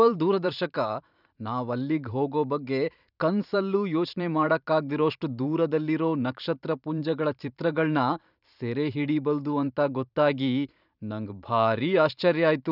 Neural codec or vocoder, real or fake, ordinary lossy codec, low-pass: vocoder, 44.1 kHz, 128 mel bands every 512 samples, BigVGAN v2; fake; none; 5.4 kHz